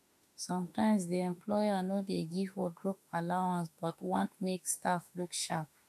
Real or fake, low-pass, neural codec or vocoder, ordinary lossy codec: fake; 14.4 kHz; autoencoder, 48 kHz, 32 numbers a frame, DAC-VAE, trained on Japanese speech; MP3, 96 kbps